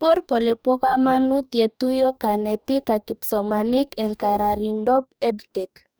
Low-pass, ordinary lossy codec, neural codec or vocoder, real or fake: none; none; codec, 44.1 kHz, 2.6 kbps, DAC; fake